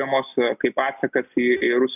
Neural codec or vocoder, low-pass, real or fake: none; 3.6 kHz; real